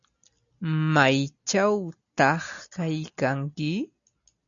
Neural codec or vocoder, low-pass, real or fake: none; 7.2 kHz; real